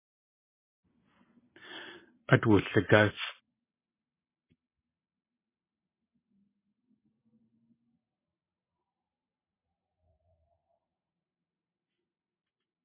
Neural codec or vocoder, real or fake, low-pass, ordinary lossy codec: vocoder, 44.1 kHz, 128 mel bands every 512 samples, BigVGAN v2; fake; 3.6 kHz; MP3, 16 kbps